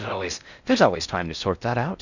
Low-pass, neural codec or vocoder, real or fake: 7.2 kHz; codec, 16 kHz in and 24 kHz out, 0.6 kbps, FocalCodec, streaming, 4096 codes; fake